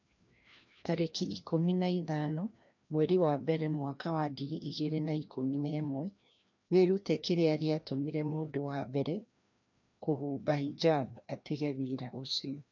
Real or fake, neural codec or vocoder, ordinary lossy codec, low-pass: fake; codec, 16 kHz, 1 kbps, FreqCodec, larger model; MP3, 64 kbps; 7.2 kHz